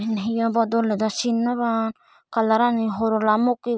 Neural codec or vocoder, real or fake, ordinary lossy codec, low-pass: none; real; none; none